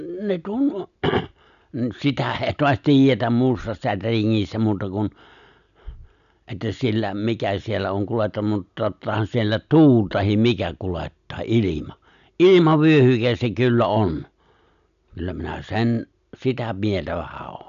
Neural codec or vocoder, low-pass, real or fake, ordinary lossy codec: none; 7.2 kHz; real; none